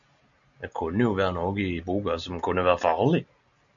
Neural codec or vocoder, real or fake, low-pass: none; real; 7.2 kHz